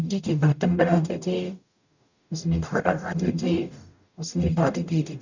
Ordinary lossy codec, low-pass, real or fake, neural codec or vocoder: none; 7.2 kHz; fake; codec, 44.1 kHz, 0.9 kbps, DAC